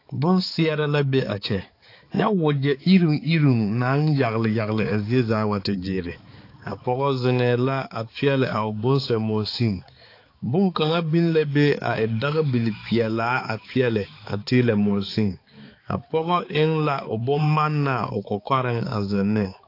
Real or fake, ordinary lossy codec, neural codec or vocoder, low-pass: fake; AAC, 32 kbps; codec, 16 kHz, 4 kbps, X-Codec, HuBERT features, trained on balanced general audio; 5.4 kHz